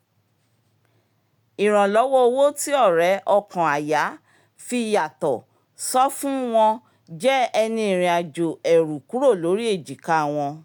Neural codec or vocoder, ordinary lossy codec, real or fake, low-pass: none; none; real; 19.8 kHz